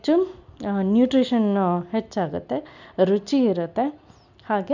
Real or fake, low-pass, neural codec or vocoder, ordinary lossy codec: real; 7.2 kHz; none; none